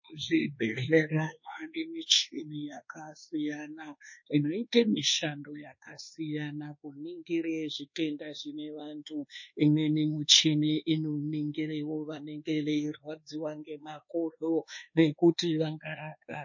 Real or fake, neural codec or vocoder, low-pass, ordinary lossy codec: fake; codec, 24 kHz, 1.2 kbps, DualCodec; 7.2 kHz; MP3, 32 kbps